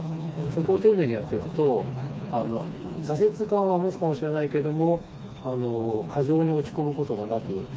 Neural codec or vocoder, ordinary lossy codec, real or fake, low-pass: codec, 16 kHz, 2 kbps, FreqCodec, smaller model; none; fake; none